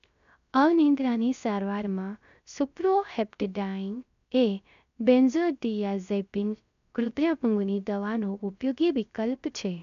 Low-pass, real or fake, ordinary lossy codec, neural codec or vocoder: 7.2 kHz; fake; none; codec, 16 kHz, 0.3 kbps, FocalCodec